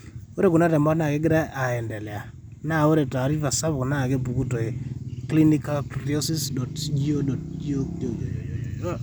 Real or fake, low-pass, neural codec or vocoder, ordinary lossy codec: real; none; none; none